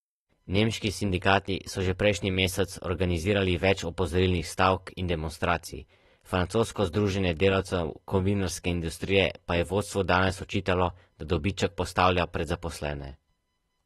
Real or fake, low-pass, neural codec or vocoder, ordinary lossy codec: real; 19.8 kHz; none; AAC, 32 kbps